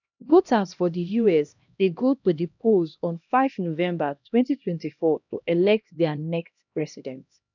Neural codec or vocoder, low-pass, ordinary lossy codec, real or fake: codec, 16 kHz, 1 kbps, X-Codec, HuBERT features, trained on LibriSpeech; 7.2 kHz; none; fake